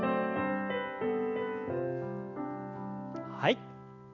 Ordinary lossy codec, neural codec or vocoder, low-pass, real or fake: none; none; 7.2 kHz; real